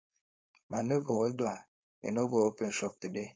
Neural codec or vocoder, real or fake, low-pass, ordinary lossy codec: codec, 16 kHz, 4.8 kbps, FACodec; fake; none; none